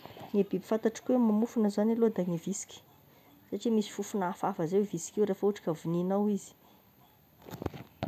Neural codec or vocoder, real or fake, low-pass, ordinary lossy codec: none; real; 14.4 kHz; none